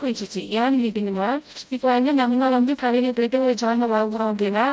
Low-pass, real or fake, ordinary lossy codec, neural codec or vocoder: none; fake; none; codec, 16 kHz, 0.5 kbps, FreqCodec, smaller model